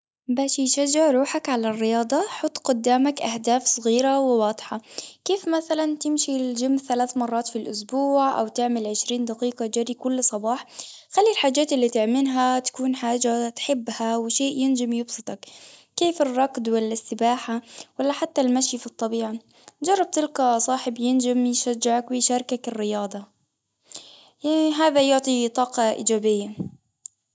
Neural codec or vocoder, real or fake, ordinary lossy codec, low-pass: none; real; none; none